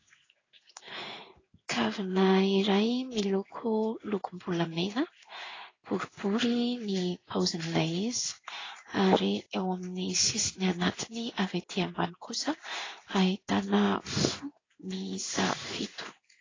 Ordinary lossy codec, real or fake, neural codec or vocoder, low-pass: AAC, 32 kbps; fake; codec, 16 kHz in and 24 kHz out, 1 kbps, XY-Tokenizer; 7.2 kHz